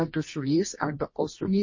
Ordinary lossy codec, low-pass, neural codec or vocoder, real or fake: MP3, 32 kbps; 7.2 kHz; codec, 24 kHz, 0.9 kbps, WavTokenizer, medium music audio release; fake